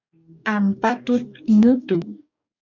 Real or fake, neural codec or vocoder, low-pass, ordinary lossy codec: fake; codec, 44.1 kHz, 2.6 kbps, DAC; 7.2 kHz; MP3, 64 kbps